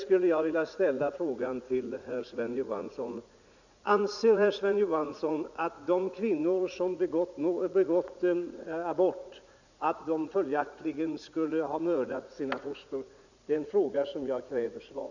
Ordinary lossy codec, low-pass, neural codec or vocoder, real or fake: none; 7.2 kHz; vocoder, 44.1 kHz, 80 mel bands, Vocos; fake